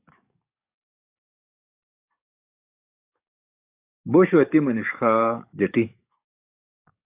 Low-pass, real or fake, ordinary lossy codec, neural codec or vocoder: 3.6 kHz; fake; AAC, 32 kbps; codec, 44.1 kHz, 7.8 kbps, DAC